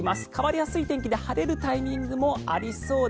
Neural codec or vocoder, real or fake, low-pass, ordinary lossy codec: none; real; none; none